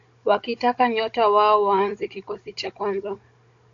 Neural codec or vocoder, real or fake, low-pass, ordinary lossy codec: codec, 16 kHz, 16 kbps, FunCodec, trained on Chinese and English, 50 frames a second; fake; 7.2 kHz; AAC, 48 kbps